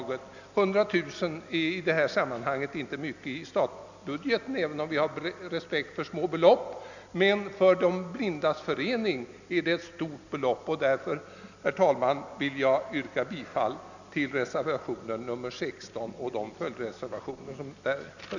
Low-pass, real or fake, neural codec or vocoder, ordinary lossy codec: 7.2 kHz; real; none; none